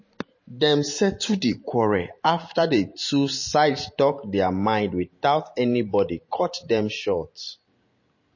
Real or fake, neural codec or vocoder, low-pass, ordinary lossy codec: fake; vocoder, 44.1 kHz, 128 mel bands every 512 samples, BigVGAN v2; 7.2 kHz; MP3, 32 kbps